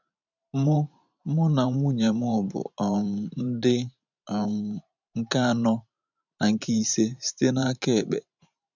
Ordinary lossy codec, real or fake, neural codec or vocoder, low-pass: none; fake; vocoder, 44.1 kHz, 128 mel bands every 512 samples, BigVGAN v2; 7.2 kHz